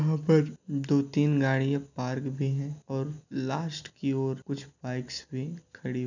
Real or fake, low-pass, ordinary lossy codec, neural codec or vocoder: real; 7.2 kHz; none; none